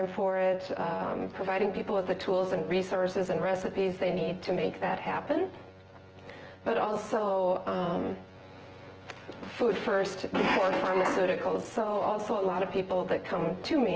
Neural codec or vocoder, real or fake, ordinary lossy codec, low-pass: vocoder, 24 kHz, 100 mel bands, Vocos; fake; Opus, 16 kbps; 7.2 kHz